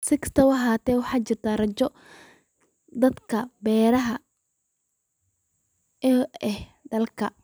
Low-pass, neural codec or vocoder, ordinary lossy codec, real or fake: none; vocoder, 44.1 kHz, 128 mel bands every 256 samples, BigVGAN v2; none; fake